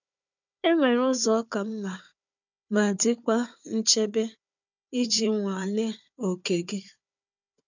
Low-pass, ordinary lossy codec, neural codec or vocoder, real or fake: 7.2 kHz; none; codec, 16 kHz, 4 kbps, FunCodec, trained on Chinese and English, 50 frames a second; fake